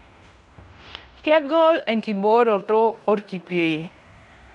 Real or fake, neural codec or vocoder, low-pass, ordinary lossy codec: fake; codec, 16 kHz in and 24 kHz out, 0.9 kbps, LongCat-Audio-Codec, fine tuned four codebook decoder; 10.8 kHz; none